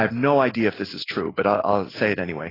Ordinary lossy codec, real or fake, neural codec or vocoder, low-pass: AAC, 24 kbps; real; none; 5.4 kHz